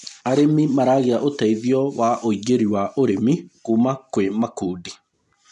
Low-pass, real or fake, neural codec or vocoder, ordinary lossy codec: 10.8 kHz; real; none; none